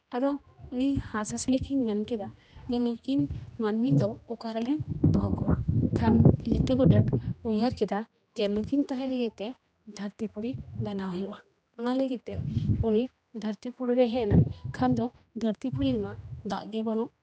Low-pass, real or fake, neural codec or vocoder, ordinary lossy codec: none; fake; codec, 16 kHz, 1 kbps, X-Codec, HuBERT features, trained on general audio; none